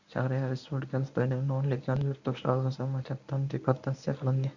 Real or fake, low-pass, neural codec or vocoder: fake; 7.2 kHz; codec, 24 kHz, 0.9 kbps, WavTokenizer, medium speech release version 1